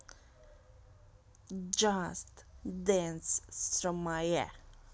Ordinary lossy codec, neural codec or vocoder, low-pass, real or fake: none; none; none; real